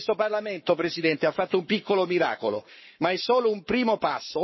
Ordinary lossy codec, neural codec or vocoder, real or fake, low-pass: MP3, 24 kbps; codec, 44.1 kHz, 7.8 kbps, Pupu-Codec; fake; 7.2 kHz